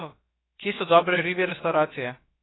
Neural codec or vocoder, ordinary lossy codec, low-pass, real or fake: codec, 16 kHz, about 1 kbps, DyCAST, with the encoder's durations; AAC, 16 kbps; 7.2 kHz; fake